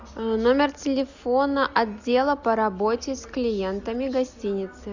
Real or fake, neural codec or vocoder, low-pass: real; none; 7.2 kHz